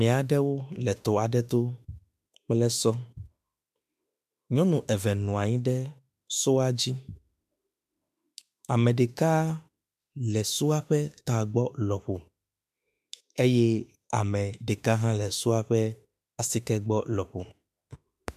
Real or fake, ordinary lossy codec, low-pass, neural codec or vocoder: fake; MP3, 96 kbps; 14.4 kHz; autoencoder, 48 kHz, 32 numbers a frame, DAC-VAE, trained on Japanese speech